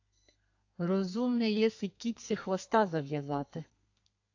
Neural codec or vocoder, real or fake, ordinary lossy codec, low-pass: codec, 32 kHz, 1.9 kbps, SNAC; fake; AAC, 48 kbps; 7.2 kHz